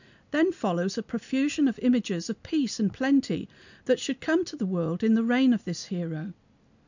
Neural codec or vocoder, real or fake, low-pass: none; real; 7.2 kHz